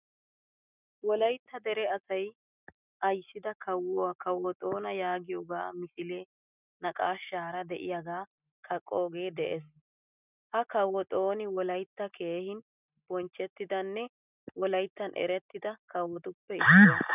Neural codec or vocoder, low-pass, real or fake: none; 3.6 kHz; real